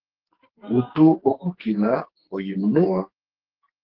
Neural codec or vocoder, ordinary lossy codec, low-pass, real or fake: codec, 44.1 kHz, 2.6 kbps, SNAC; Opus, 16 kbps; 5.4 kHz; fake